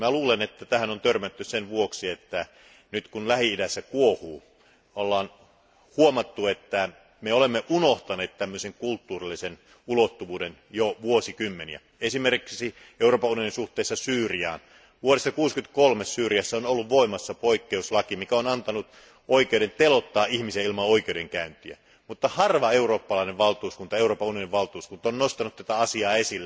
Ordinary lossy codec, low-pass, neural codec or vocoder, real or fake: none; none; none; real